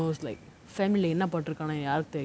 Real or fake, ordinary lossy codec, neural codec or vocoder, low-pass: real; none; none; none